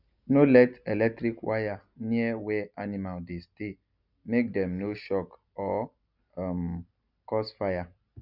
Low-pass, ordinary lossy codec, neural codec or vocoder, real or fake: 5.4 kHz; none; none; real